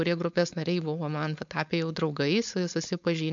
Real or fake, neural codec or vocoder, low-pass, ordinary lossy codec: fake; codec, 16 kHz, 4.8 kbps, FACodec; 7.2 kHz; MP3, 64 kbps